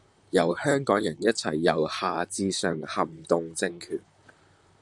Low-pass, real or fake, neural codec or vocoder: 10.8 kHz; fake; vocoder, 44.1 kHz, 128 mel bands, Pupu-Vocoder